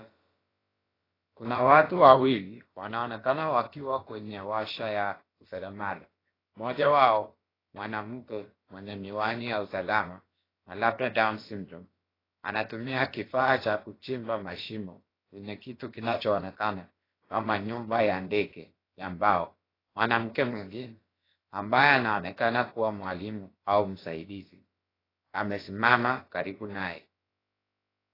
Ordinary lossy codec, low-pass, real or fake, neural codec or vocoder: AAC, 24 kbps; 5.4 kHz; fake; codec, 16 kHz, about 1 kbps, DyCAST, with the encoder's durations